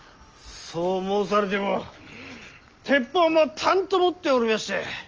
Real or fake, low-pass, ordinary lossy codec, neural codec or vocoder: real; 7.2 kHz; Opus, 24 kbps; none